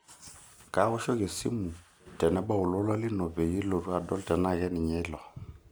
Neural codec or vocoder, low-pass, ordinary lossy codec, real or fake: none; none; none; real